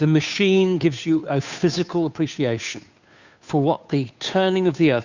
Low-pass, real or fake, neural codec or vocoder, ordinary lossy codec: 7.2 kHz; fake; codec, 16 kHz, 2 kbps, FunCodec, trained on Chinese and English, 25 frames a second; Opus, 64 kbps